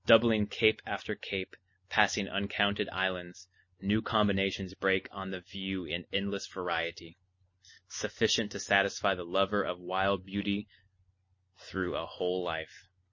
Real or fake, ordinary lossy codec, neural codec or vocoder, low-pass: real; MP3, 32 kbps; none; 7.2 kHz